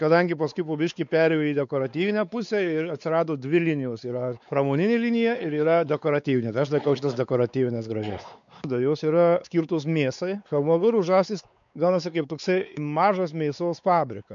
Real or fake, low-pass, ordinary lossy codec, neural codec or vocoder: fake; 7.2 kHz; MP3, 96 kbps; codec, 16 kHz, 4 kbps, X-Codec, WavLM features, trained on Multilingual LibriSpeech